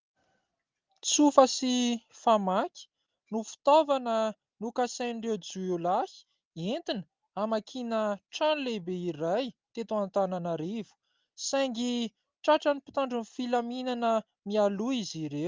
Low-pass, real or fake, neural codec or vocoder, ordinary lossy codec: 7.2 kHz; real; none; Opus, 24 kbps